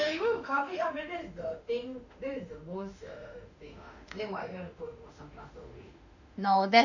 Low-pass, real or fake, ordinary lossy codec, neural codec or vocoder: 7.2 kHz; fake; none; autoencoder, 48 kHz, 32 numbers a frame, DAC-VAE, trained on Japanese speech